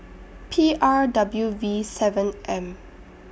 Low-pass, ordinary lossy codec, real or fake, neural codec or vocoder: none; none; real; none